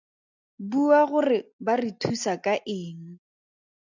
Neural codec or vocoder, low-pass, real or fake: none; 7.2 kHz; real